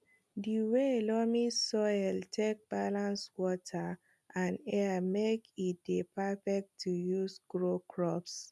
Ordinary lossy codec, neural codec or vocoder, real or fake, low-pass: none; none; real; none